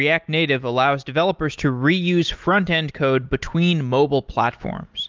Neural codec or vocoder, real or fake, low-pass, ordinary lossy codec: none; real; 7.2 kHz; Opus, 24 kbps